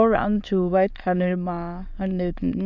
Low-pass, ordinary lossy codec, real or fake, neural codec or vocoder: 7.2 kHz; none; fake; autoencoder, 22.05 kHz, a latent of 192 numbers a frame, VITS, trained on many speakers